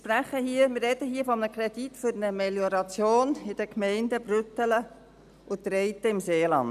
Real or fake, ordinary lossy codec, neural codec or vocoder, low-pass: real; none; none; 14.4 kHz